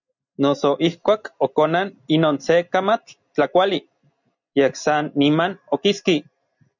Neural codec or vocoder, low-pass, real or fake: none; 7.2 kHz; real